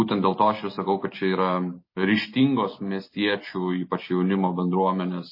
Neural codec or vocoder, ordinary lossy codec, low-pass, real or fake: none; MP3, 24 kbps; 5.4 kHz; real